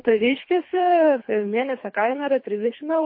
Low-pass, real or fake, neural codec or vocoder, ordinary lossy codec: 5.4 kHz; fake; codec, 24 kHz, 3 kbps, HILCodec; MP3, 32 kbps